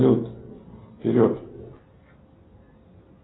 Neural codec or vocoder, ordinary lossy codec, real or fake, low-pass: none; AAC, 16 kbps; real; 7.2 kHz